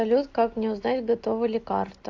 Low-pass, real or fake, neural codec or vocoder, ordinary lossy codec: 7.2 kHz; real; none; AAC, 48 kbps